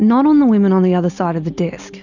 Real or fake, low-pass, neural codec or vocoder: real; 7.2 kHz; none